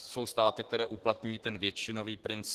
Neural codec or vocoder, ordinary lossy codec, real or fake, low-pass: codec, 32 kHz, 1.9 kbps, SNAC; Opus, 16 kbps; fake; 14.4 kHz